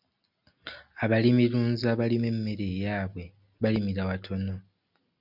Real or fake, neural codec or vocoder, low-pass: real; none; 5.4 kHz